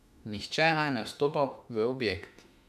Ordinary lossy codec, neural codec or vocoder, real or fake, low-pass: none; autoencoder, 48 kHz, 32 numbers a frame, DAC-VAE, trained on Japanese speech; fake; 14.4 kHz